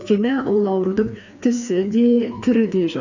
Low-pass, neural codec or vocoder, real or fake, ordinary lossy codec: 7.2 kHz; codec, 16 kHz, 2 kbps, FreqCodec, larger model; fake; none